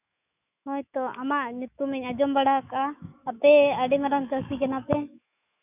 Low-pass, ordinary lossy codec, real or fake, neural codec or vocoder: 3.6 kHz; none; fake; autoencoder, 48 kHz, 128 numbers a frame, DAC-VAE, trained on Japanese speech